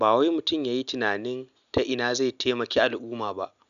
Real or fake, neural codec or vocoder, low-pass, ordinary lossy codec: real; none; 7.2 kHz; none